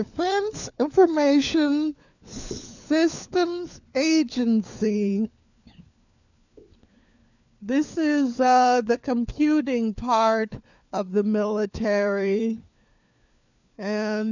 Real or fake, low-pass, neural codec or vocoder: fake; 7.2 kHz; codec, 16 kHz, 4 kbps, FunCodec, trained on LibriTTS, 50 frames a second